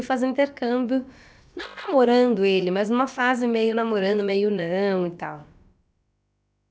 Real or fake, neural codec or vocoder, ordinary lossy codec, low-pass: fake; codec, 16 kHz, about 1 kbps, DyCAST, with the encoder's durations; none; none